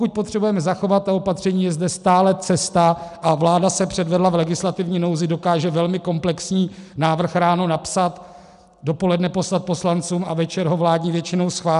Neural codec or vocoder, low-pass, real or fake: none; 10.8 kHz; real